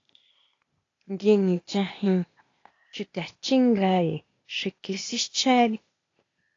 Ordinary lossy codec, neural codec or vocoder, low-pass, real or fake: AAC, 32 kbps; codec, 16 kHz, 0.8 kbps, ZipCodec; 7.2 kHz; fake